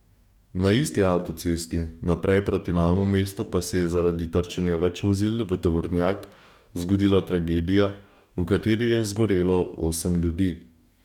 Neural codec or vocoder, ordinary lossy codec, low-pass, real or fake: codec, 44.1 kHz, 2.6 kbps, DAC; none; 19.8 kHz; fake